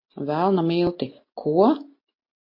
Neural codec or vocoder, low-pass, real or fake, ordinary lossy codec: none; 5.4 kHz; real; MP3, 32 kbps